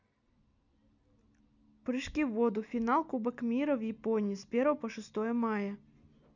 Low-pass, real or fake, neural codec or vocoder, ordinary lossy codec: 7.2 kHz; real; none; none